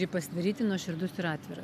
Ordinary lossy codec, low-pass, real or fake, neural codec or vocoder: AAC, 96 kbps; 14.4 kHz; real; none